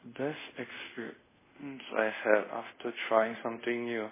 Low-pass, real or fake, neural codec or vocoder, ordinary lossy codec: 3.6 kHz; fake; codec, 24 kHz, 0.5 kbps, DualCodec; MP3, 16 kbps